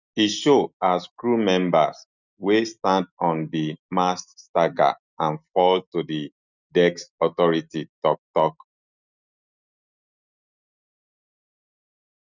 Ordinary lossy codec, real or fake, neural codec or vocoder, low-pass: none; real; none; 7.2 kHz